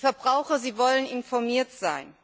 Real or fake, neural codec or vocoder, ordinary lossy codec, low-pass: real; none; none; none